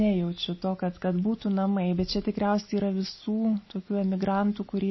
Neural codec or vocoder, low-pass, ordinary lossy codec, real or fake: none; 7.2 kHz; MP3, 24 kbps; real